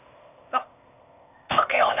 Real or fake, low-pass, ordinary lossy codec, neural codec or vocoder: fake; 3.6 kHz; none; codec, 16 kHz, 0.8 kbps, ZipCodec